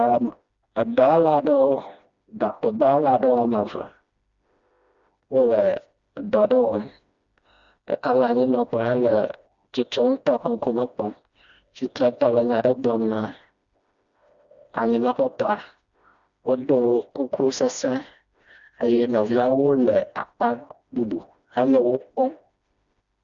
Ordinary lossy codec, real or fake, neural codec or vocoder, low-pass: Opus, 64 kbps; fake; codec, 16 kHz, 1 kbps, FreqCodec, smaller model; 7.2 kHz